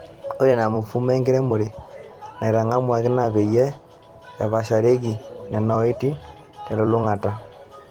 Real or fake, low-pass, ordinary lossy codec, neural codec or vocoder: fake; 19.8 kHz; Opus, 24 kbps; vocoder, 44.1 kHz, 128 mel bands every 256 samples, BigVGAN v2